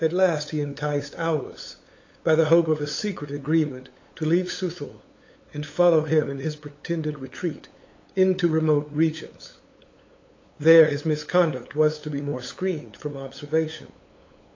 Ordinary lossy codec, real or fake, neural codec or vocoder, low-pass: AAC, 32 kbps; fake; codec, 16 kHz, 8 kbps, FunCodec, trained on LibriTTS, 25 frames a second; 7.2 kHz